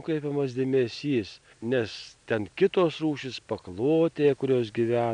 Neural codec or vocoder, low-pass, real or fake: none; 9.9 kHz; real